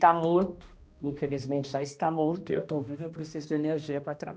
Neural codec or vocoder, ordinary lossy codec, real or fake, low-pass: codec, 16 kHz, 1 kbps, X-Codec, HuBERT features, trained on balanced general audio; none; fake; none